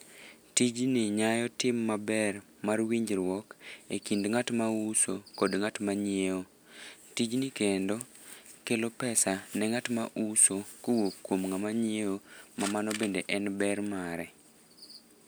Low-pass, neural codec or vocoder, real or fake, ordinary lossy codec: none; none; real; none